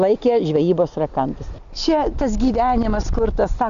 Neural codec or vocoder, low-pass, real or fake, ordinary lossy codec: none; 7.2 kHz; real; AAC, 96 kbps